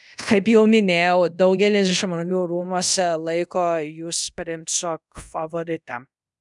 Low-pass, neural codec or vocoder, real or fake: 10.8 kHz; codec, 24 kHz, 0.5 kbps, DualCodec; fake